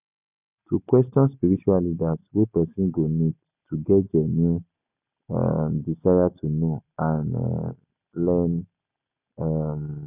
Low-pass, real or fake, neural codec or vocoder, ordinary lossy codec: 3.6 kHz; real; none; none